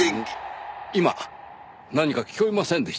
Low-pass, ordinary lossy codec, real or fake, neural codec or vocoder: none; none; real; none